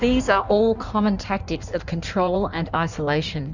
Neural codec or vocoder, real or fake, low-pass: codec, 16 kHz in and 24 kHz out, 1.1 kbps, FireRedTTS-2 codec; fake; 7.2 kHz